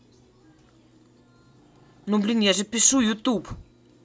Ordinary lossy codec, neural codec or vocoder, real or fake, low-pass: none; none; real; none